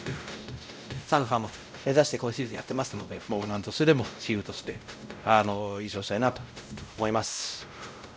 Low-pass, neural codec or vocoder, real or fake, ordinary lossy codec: none; codec, 16 kHz, 0.5 kbps, X-Codec, WavLM features, trained on Multilingual LibriSpeech; fake; none